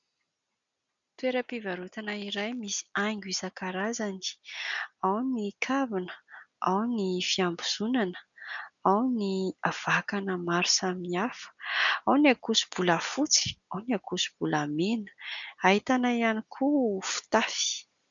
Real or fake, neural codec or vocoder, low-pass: real; none; 7.2 kHz